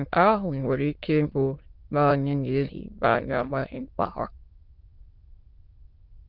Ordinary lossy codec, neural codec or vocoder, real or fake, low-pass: Opus, 32 kbps; autoencoder, 22.05 kHz, a latent of 192 numbers a frame, VITS, trained on many speakers; fake; 5.4 kHz